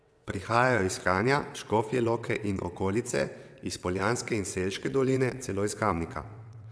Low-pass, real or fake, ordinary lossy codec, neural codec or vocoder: none; fake; none; vocoder, 22.05 kHz, 80 mel bands, WaveNeXt